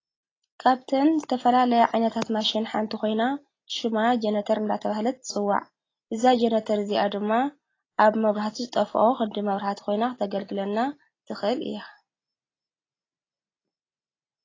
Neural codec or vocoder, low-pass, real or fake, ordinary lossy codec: none; 7.2 kHz; real; AAC, 32 kbps